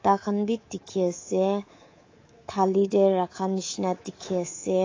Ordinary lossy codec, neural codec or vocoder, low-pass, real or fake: AAC, 32 kbps; codec, 24 kHz, 3.1 kbps, DualCodec; 7.2 kHz; fake